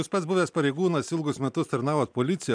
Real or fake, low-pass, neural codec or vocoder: real; 9.9 kHz; none